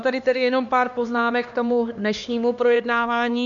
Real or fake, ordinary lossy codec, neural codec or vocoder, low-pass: fake; MP3, 64 kbps; codec, 16 kHz, 2 kbps, X-Codec, HuBERT features, trained on LibriSpeech; 7.2 kHz